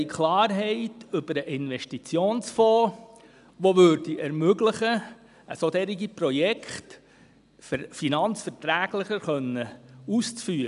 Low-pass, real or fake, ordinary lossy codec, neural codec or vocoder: 10.8 kHz; real; none; none